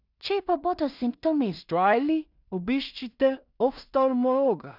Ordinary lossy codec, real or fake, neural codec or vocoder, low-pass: none; fake; codec, 16 kHz in and 24 kHz out, 0.4 kbps, LongCat-Audio-Codec, two codebook decoder; 5.4 kHz